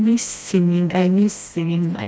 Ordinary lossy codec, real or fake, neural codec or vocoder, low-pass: none; fake; codec, 16 kHz, 1 kbps, FreqCodec, smaller model; none